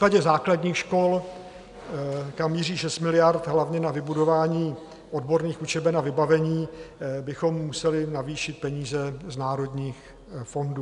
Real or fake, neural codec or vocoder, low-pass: real; none; 10.8 kHz